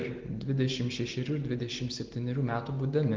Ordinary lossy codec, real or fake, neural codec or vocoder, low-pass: Opus, 16 kbps; real; none; 7.2 kHz